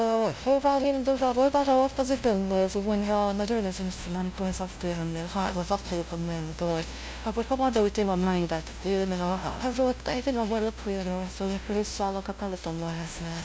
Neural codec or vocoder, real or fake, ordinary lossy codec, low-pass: codec, 16 kHz, 0.5 kbps, FunCodec, trained on LibriTTS, 25 frames a second; fake; none; none